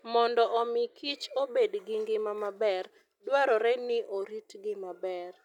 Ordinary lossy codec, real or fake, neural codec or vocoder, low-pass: none; real; none; 19.8 kHz